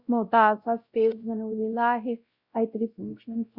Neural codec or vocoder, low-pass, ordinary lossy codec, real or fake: codec, 16 kHz, 0.5 kbps, X-Codec, WavLM features, trained on Multilingual LibriSpeech; 5.4 kHz; Opus, 64 kbps; fake